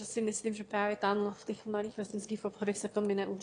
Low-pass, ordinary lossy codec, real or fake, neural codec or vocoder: 9.9 kHz; AAC, 48 kbps; fake; autoencoder, 22.05 kHz, a latent of 192 numbers a frame, VITS, trained on one speaker